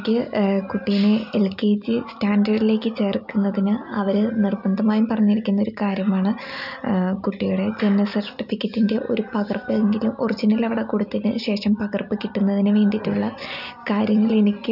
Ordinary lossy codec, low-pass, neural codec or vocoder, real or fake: none; 5.4 kHz; none; real